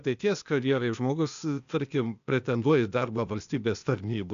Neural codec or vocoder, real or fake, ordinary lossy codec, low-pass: codec, 16 kHz, 0.8 kbps, ZipCodec; fake; AAC, 96 kbps; 7.2 kHz